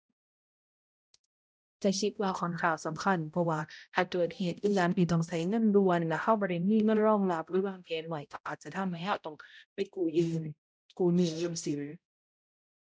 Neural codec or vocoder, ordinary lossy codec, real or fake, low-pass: codec, 16 kHz, 0.5 kbps, X-Codec, HuBERT features, trained on balanced general audio; none; fake; none